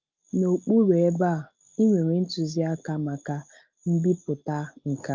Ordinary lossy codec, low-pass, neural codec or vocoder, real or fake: Opus, 24 kbps; 7.2 kHz; none; real